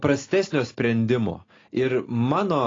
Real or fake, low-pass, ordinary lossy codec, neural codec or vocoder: real; 7.2 kHz; AAC, 32 kbps; none